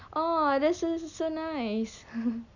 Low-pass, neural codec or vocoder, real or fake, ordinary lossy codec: 7.2 kHz; none; real; none